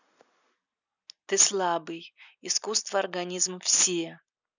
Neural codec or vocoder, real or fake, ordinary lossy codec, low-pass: none; real; none; 7.2 kHz